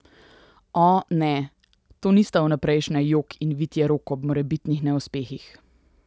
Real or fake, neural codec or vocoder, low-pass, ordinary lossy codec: real; none; none; none